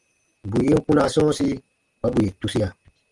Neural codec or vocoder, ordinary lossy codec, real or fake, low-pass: none; Opus, 24 kbps; real; 10.8 kHz